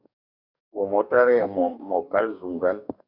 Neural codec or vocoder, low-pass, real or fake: codec, 44.1 kHz, 2.6 kbps, DAC; 5.4 kHz; fake